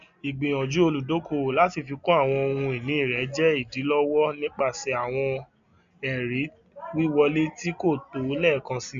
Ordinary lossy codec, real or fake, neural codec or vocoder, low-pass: none; real; none; 7.2 kHz